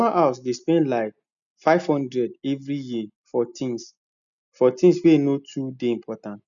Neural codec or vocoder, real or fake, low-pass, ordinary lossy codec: none; real; 7.2 kHz; none